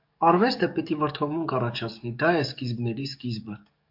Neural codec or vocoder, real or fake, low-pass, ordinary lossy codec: codec, 44.1 kHz, 7.8 kbps, DAC; fake; 5.4 kHz; MP3, 48 kbps